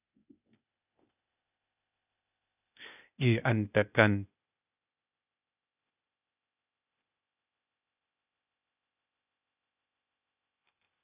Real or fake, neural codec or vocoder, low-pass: fake; codec, 16 kHz, 0.8 kbps, ZipCodec; 3.6 kHz